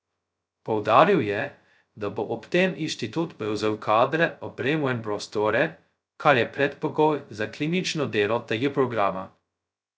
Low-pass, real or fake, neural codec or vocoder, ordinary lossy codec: none; fake; codec, 16 kHz, 0.2 kbps, FocalCodec; none